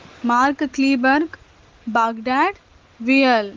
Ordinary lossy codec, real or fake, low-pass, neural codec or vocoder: Opus, 16 kbps; real; 7.2 kHz; none